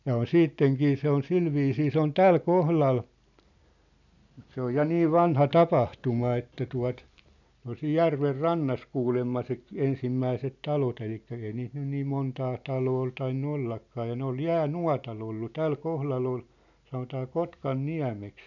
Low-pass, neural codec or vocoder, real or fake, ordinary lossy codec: 7.2 kHz; none; real; none